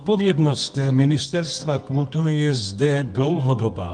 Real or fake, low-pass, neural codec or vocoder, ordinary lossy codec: fake; 9.9 kHz; codec, 24 kHz, 0.9 kbps, WavTokenizer, medium music audio release; Opus, 32 kbps